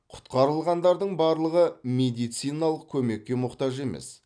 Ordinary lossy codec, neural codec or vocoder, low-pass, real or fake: none; none; 9.9 kHz; real